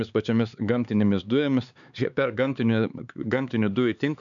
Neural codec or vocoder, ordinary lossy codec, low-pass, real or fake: codec, 16 kHz, 4 kbps, X-Codec, HuBERT features, trained on LibriSpeech; MP3, 96 kbps; 7.2 kHz; fake